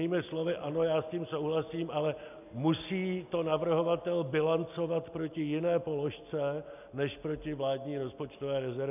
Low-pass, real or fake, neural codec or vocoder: 3.6 kHz; real; none